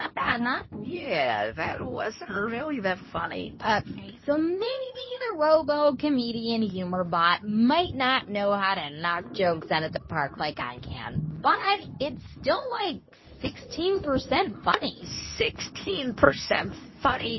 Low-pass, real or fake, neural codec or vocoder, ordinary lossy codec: 7.2 kHz; fake; codec, 24 kHz, 0.9 kbps, WavTokenizer, medium speech release version 2; MP3, 24 kbps